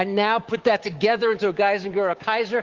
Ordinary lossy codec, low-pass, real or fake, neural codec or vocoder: Opus, 16 kbps; 7.2 kHz; fake; codec, 16 kHz, 6 kbps, DAC